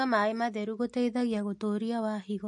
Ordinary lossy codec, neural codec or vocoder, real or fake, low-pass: MP3, 48 kbps; none; real; 10.8 kHz